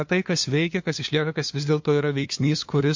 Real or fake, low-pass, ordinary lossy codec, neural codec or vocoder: fake; 7.2 kHz; MP3, 48 kbps; codec, 16 kHz, 2 kbps, FunCodec, trained on LibriTTS, 25 frames a second